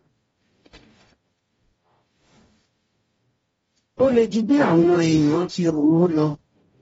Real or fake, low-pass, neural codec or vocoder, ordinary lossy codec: fake; 19.8 kHz; codec, 44.1 kHz, 0.9 kbps, DAC; AAC, 24 kbps